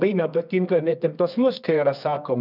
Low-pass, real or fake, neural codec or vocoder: 5.4 kHz; fake; codec, 24 kHz, 0.9 kbps, WavTokenizer, medium music audio release